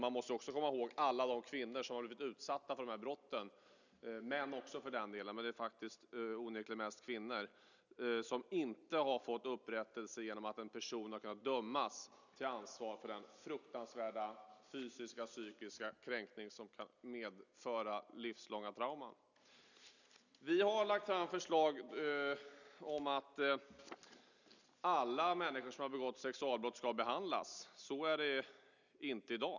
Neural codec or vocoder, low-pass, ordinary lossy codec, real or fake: none; 7.2 kHz; none; real